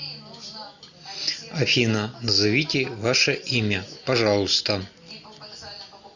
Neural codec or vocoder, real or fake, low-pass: none; real; 7.2 kHz